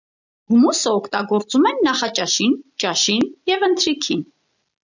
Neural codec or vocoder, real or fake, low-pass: none; real; 7.2 kHz